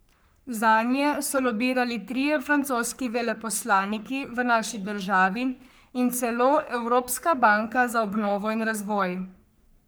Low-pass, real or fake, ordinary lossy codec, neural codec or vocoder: none; fake; none; codec, 44.1 kHz, 3.4 kbps, Pupu-Codec